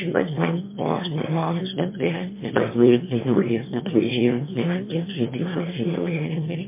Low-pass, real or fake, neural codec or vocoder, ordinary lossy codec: 3.6 kHz; fake; autoencoder, 22.05 kHz, a latent of 192 numbers a frame, VITS, trained on one speaker; MP3, 24 kbps